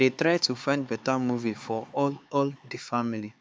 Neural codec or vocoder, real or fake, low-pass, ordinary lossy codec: codec, 16 kHz, 4 kbps, X-Codec, HuBERT features, trained on LibriSpeech; fake; none; none